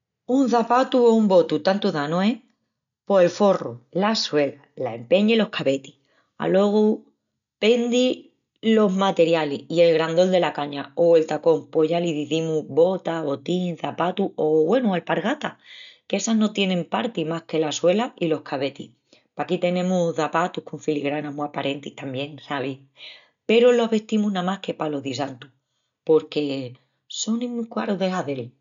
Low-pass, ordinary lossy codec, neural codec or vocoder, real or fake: 7.2 kHz; none; none; real